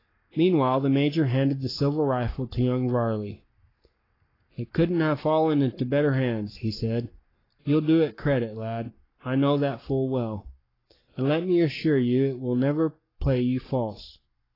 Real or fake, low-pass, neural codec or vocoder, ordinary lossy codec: fake; 5.4 kHz; codec, 44.1 kHz, 7.8 kbps, Pupu-Codec; AAC, 24 kbps